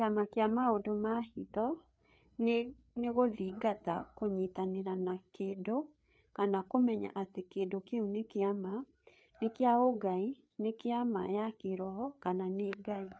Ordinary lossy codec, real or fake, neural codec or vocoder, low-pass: none; fake; codec, 16 kHz, 4 kbps, FreqCodec, larger model; none